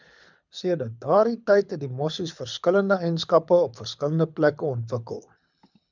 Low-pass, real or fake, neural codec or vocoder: 7.2 kHz; fake; codec, 24 kHz, 6 kbps, HILCodec